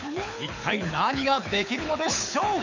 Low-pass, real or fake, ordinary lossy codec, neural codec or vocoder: 7.2 kHz; fake; none; codec, 24 kHz, 6 kbps, HILCodec